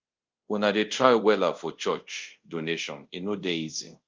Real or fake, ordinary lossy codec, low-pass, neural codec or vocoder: fake; Opus, 24 kbps; 7.2 kHz; codec, 24 kHz, 0.5 kbps, DualCodec